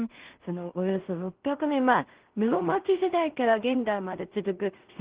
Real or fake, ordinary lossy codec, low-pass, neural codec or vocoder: fake; Opus, 16 kbps; 3.6 kHz; codec, 16 kHz in and 24 kHz out, 0.4 kbps, LongCat-Audio-Codec, two codebook decoder